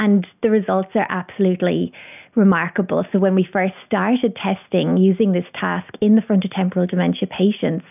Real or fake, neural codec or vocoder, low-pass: real; none; 3.6 kHz